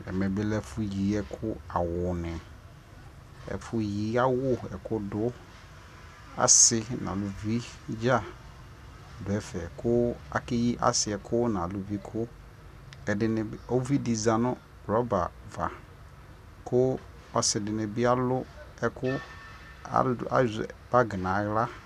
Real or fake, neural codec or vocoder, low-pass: real; none; 14.4 kHz